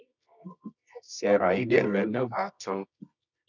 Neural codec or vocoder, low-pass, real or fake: codec, 24 kHz, 0.9 kbps, WavTokenizer, medium music audio release; 7.2 kHz; fake